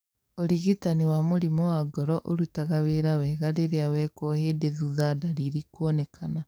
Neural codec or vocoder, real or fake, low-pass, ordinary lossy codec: codec, 44.1 kHz, 7.8 kbps, DAC; fake; none; none